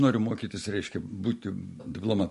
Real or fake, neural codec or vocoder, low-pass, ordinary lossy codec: real; none; 14.4 kHz; MP3, 48 kbps